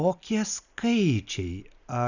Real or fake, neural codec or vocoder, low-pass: real; none; 7.2 kHz